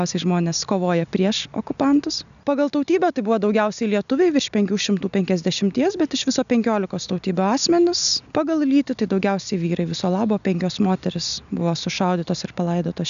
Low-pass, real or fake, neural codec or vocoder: 7.2 kHz; real; none